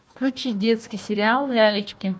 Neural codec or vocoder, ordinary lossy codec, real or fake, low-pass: codec, 16 kHz, 1 kbps, FunCodec, trained on Chinese and English, 50 frames a second; none; fake; none